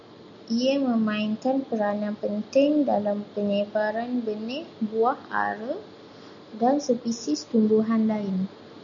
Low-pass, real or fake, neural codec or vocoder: 7.2 kHz; real; none